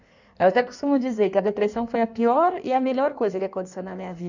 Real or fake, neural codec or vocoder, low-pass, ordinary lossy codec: fake; codec, 16 kHz in and 24 kHz out, 1.1 kbps, FireRedTTS-2 codec; 7.2 kHz; none